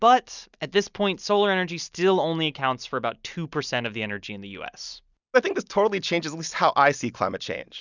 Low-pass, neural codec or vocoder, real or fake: 7.2 kHz; none; real